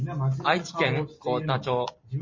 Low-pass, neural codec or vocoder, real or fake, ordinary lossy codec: 7.2 kHz; vocoder, 44.1 kHz, 128 mel bands every 256 samples, BigVGAN v2; fake; MP3, 32 kbps